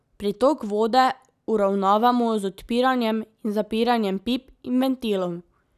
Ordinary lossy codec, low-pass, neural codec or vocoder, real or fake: none; 14.4 kHz; none; real